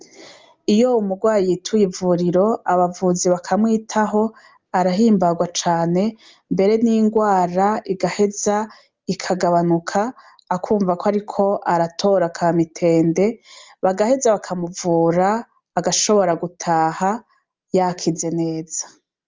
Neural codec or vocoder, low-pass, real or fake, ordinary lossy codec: none; 7.2 kHz; real; Opus, 32 kbps